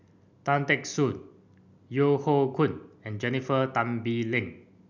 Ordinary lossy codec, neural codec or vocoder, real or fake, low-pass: none; none; real; 7.2 kHz